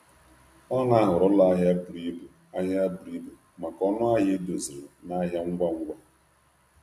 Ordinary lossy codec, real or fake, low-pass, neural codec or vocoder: none; real; 14.4 kHz; none